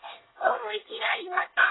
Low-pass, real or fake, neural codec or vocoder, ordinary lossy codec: 7.2 kHz; fake; codec, 24 kHz, 1 kbps, SNAC; AAC, 16 kbps